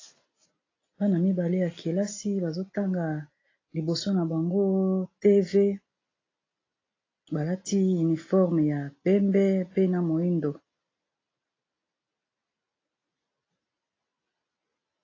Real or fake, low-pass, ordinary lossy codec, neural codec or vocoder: real; 7.2 kHz; AAC, 32 kbps; none